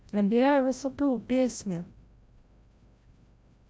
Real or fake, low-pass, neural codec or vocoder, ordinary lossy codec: fake; none; codec, 16 kHz, 0.5 kbps, FreqCodec, larger model; none